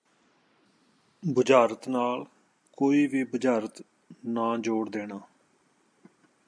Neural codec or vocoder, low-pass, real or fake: none; 9.9 kHz; real